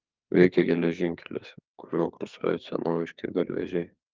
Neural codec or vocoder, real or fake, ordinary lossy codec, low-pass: codec, 44.1 kHz, 2.6 kbps, SNAC; fake; Opus, 24 kbps; 7.2 kHz